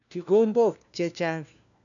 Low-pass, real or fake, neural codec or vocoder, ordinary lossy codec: 7.2 kHz; fake; codec, 16 kHz, 1 kbps, FunCodec, trained on LibriTTS, 50 frames a second; none